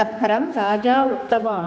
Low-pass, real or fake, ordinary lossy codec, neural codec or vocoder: none; fake; none; codec, 16 kHz, 2 kbps, X-Codec, HuBERT features, trained on general audio